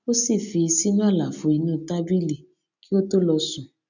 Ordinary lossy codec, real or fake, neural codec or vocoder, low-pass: none; real; none; 7.2 kHz